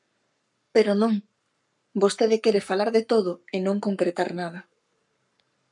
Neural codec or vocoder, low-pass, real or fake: codec, 44.1 kHz, 7.8 kbps, Pupu-Codec; 10.8 kHz; fake